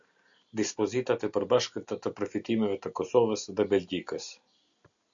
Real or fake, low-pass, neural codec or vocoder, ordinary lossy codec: real; 7.2 kHz; none; MP3, 64 kbps